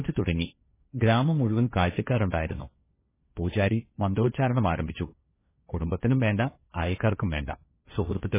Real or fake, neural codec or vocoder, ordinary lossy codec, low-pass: fake; codec, 16 kHz, 1.1 kbps, Voila-Tokenizer; MP3, 16 kbps; 3.6 kHz